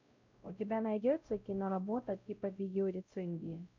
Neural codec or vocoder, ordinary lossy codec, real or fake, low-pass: codec, 16 kHz, 0.5 kbps, X-Codec, WavLM features, trained on Multilingual LibriSpeech; MP3, 48 kbps; fake; 7.2 kHz